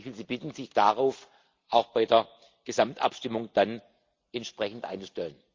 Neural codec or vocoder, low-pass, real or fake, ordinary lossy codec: none; 7.2 kHz; real; Opus, 32 kbps